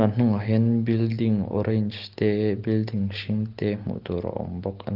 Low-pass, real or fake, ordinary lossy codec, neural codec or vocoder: 5.4 kHz; real; Opus, 16 kbps; none